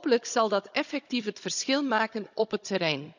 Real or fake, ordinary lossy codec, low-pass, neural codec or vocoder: fake; none; 7.2 kHz; codec, 16 kHz, 16 kbps, FunCodec, trained on Chinese and English, 50 frames a second